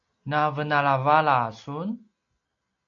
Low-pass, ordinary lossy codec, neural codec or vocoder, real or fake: 7.2 kHz; AAC, 48 kbps; none; real